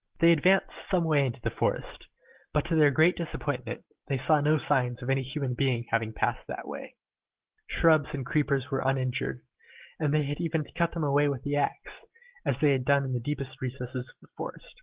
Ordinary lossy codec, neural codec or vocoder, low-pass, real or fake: Opus, 16 kbps; none; 3.6 kHz; real